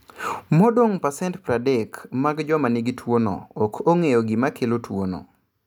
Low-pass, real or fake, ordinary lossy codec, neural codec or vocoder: none; real; none; none